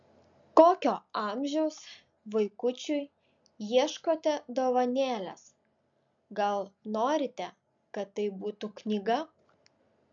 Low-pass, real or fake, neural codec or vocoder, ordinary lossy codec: 7.2 kHz; real; none; MP3, 64 kbps